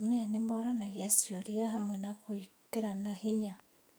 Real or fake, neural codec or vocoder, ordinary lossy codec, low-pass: fake; codec, 44.1 kHz, 2.6 kbps, SNAC; none; none